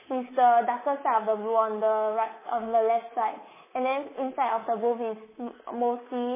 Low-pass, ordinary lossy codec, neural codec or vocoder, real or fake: 3.6 kHz; MP3, 16 kbps; codec, 24 kHz, 3.1 kbps, DualCodec; fake